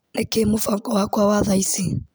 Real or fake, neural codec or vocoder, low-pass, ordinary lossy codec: real; none; none; none